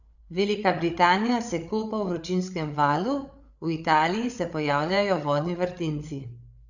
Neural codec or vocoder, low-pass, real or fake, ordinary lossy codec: codec, 16 kHz, 8 kbps, FreqCodec, larger model; 7.2 kHz; fake; none